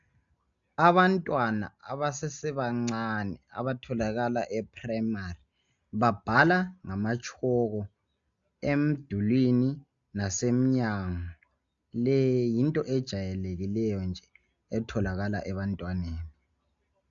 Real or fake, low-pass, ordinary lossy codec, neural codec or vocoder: real; 7.2 kHz; AAC, 64 kbps; none